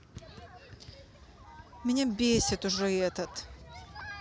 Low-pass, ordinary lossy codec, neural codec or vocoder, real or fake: none; none; none; real